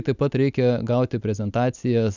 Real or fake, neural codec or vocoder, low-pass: real; none; 7.2 kHz